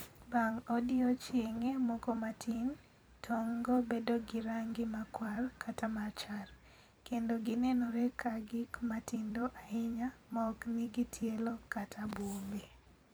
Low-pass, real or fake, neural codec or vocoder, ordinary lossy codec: none; fake; vocoder, 44.1 kHz, 128 mel bands every 256 samples, BigVGAN v2; none